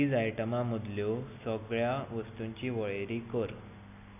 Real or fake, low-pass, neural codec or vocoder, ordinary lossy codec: real; 3.6 kHz; none; none